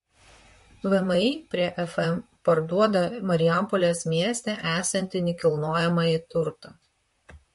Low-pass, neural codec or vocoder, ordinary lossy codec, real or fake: 14.4 kHz; vocoder, 44.1 kHz, 128 mel bands, Pupu-Vocoder; MP3, 48 kbps; fake